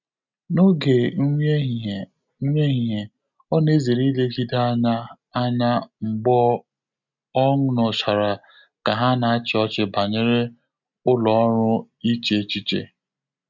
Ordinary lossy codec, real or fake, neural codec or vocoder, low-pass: none; real; none; 7.2 kHz